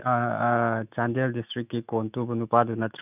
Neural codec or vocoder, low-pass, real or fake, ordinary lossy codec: autoencoder, 48 kHz, 128 numbers a frame, DAC-VAE, trained on Japanese speech; 3.6 kHz; fake; none